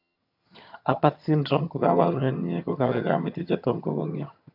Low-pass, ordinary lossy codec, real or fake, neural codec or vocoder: 5.4 kHz; none; fake; vocoder, 22.05 kHz, 80 mel bands, HiFi-GAN